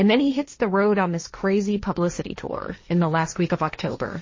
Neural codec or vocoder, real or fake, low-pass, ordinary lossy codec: codec, 16 kHz, 1.1 kbps, Voila-Tokenizer; fake; 7.2 kHz; MP3, 32 kbps